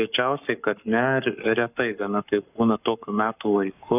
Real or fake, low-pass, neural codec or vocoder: fake; 3.6 kHz; codec, 44.1 kHz, 7.8 kbps, Pupu-Codec